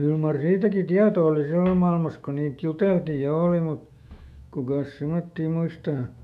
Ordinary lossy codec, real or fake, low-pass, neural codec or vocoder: none; fake; 14.4 kHz; autoencoder, 48 kHz, 128 numbers a frame, DAC-VAE, trained on Japanese speech